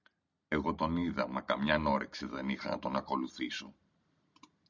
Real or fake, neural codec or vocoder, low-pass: real; none; 7.2 kHz